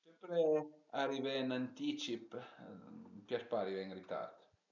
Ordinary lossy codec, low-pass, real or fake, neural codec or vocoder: none; 7.2 kHz; real; none